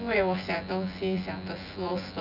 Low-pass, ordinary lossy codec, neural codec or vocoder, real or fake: 5.4 kHz; none; vocoder, 24 kHz, 100 mel bands, Vocos; fake